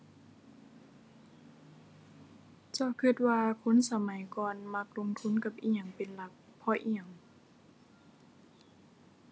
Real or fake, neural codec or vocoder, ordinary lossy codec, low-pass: real; none; none; none